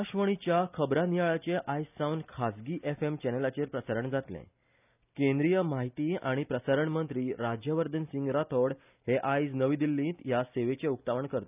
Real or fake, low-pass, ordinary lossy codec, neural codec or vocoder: real; 3.6 kHz; none; none